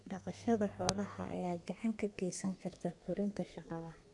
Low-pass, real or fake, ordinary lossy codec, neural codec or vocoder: 10.8 kHz; fake; none; codec, 32 kHz, 1.9 kbps, SNAC